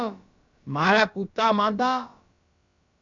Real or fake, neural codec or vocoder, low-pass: fake; codec, 16 kHz, about 1 kbps, DyCAST, with the encoder's durations; 7.2 kHz